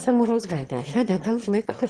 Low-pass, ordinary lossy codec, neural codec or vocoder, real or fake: 9.9 kHz; Opus, 16 kbps; autoencoder, 22.05 kHz, a latent of 192 numbers a frame, VITS, trained on one speaker; fake